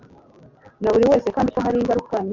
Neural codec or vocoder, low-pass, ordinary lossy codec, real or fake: none; 7.2 kHz; MP3, 64 kbps; real